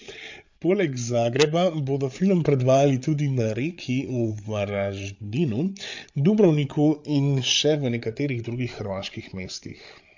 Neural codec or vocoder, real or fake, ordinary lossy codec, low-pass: codec, 16 kHz, 8 kbps, FreqCodec, larger model; fake; MP3, 48 kbps; 7.2 kHz